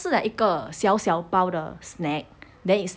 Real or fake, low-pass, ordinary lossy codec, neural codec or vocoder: real; none; none; none